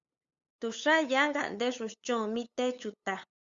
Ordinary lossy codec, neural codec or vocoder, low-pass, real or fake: Opus, 64 kbps; codec, 16 kHz, 8 kbps, FunCodec, trained on LibriTTS, 25 frames a second; 7.2 kHz; fake